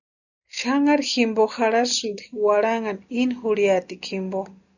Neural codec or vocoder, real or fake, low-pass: none; real; 7.2 kHz